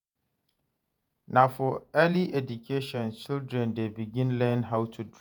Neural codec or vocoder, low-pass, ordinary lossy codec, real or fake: vocoder, 48 kHz, 128 mel bands, Vocos; none; none; fake